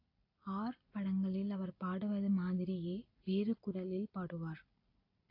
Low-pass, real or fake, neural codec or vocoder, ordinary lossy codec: 5.4 kHz; real; none; AAC, 32 kbps